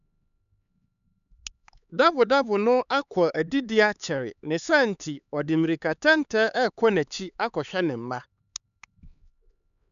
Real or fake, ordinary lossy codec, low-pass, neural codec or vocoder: fake; none; 7.2 kHz; codec, 16 kHz, 4 kbps, X-Codec, HuBERT features, trained on LibriSpeech